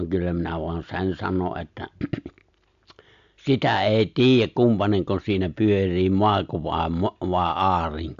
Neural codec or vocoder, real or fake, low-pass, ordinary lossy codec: none; real; 7.2 kHz; AAC, 64 kbps